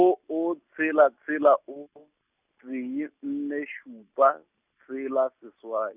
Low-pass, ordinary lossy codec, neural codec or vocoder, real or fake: 3.6 kHz; none; none; real